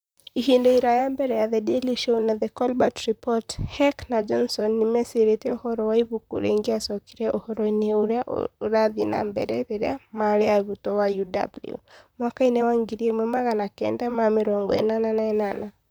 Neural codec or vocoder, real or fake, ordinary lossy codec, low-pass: vocoder, 44.1 kHz, 128 mel bands, Pupu-Vocoder; fake; none; none